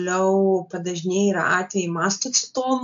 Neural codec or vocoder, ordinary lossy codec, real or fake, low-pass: none; AAC, 64 kbps; real; 7.2 kHz